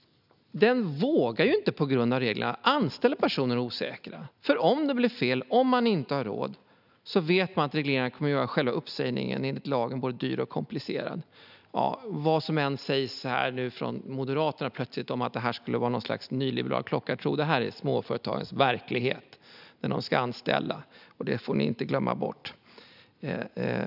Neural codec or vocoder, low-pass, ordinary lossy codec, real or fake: none; 5.4 kHz; none; real